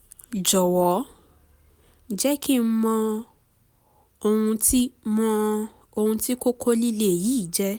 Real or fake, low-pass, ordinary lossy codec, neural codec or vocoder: real; none; none; none